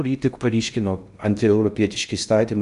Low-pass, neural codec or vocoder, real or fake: 10.8 kHz; codec, 16 kHz in and 24 kHz out, 0.6 kbps, FocalCodec, streaming, 2048 codes; fake